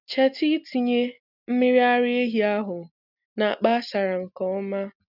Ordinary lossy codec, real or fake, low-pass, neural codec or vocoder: none; real; 5.4 kHz; none